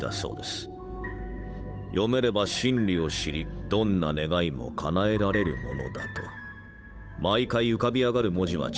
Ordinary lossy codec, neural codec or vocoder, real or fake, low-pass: none; codec, 16 kHz, 8 kbps, FunCodec, trained on Chinese and English, 25 frames a second; fake; none